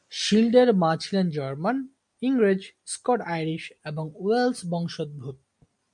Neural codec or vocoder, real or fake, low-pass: none; real; 10.8 kHz